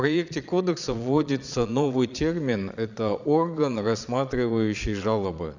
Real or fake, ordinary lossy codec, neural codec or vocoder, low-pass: fake; none; vocoder, 22.05 kHz, 80 mel bands, Vocos; 7.2 kHz